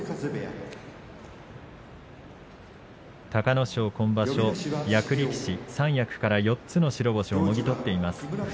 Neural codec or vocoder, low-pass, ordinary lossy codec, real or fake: none; none; none; real